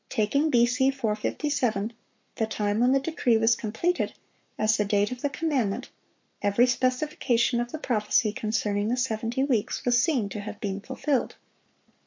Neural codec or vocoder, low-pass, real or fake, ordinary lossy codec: codec, 44.1 kHz, 7.8 kbps, Pupu-Codec; 7.2 kHz; fake; MP3, 48 kbps